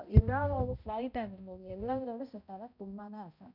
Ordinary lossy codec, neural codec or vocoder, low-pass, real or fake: none; codec, 16 kHz, 0.5 kbps, X-Codec, HuBERT features, trained on balanced general audio; 5.4 kHz; fake